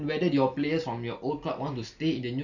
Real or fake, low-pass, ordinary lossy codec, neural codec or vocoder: real; 7.2 kHz; none; none